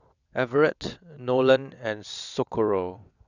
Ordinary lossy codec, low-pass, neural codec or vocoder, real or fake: none; 7.2 kHz; vocoder, 22.05 kHz, 80 mel bands, WaveNeXt; fake